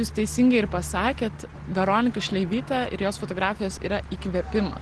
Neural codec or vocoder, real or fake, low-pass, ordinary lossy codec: none; real; 10.8 kHz; Opus, 16 kbps